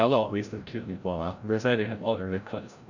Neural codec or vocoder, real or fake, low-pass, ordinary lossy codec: codec, 16 kHz, 0.5 kbps, FreqCodec, larger model; fake; 7.2 kHz; none